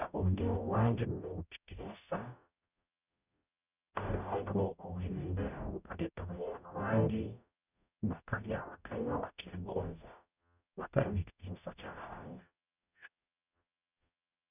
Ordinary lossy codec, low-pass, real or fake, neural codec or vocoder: none; 3.6 kHz; fake; codec, 44.1 kHz, 0.9 kbps, DAC